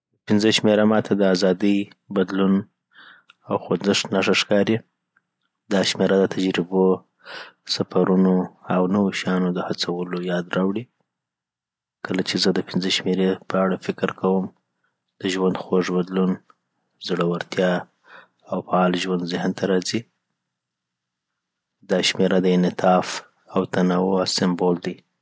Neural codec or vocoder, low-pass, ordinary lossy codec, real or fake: none; none; none; real